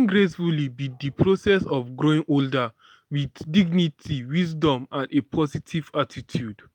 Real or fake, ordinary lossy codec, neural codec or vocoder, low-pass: real; none; none; 19.8 kHz